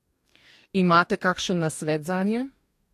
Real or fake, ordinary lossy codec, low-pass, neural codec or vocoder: fake; AAC, 64 kbps; 14.4 kHz; codec, 44.1 kHz, 2.6 kbps, DAC